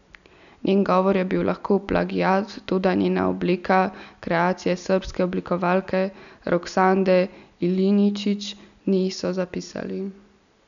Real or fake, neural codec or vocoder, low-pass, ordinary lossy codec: real; none; 7.2 kHz; none